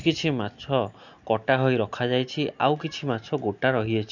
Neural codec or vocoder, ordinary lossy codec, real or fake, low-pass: none; none; real; 7.2 kHz